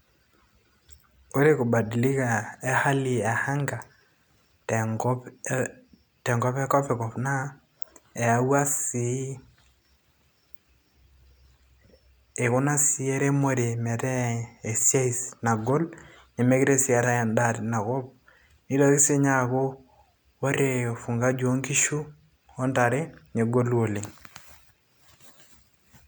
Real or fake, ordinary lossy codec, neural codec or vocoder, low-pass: real; none; none; none